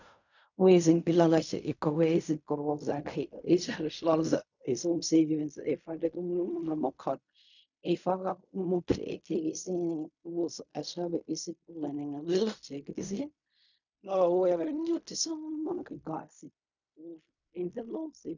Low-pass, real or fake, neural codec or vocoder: 7.2 kHz; fake; codec, 16 kHz in and 24 kHz out, 0.4 kbps, LongCat-Audio-Codec, fine tuned four codebook decoder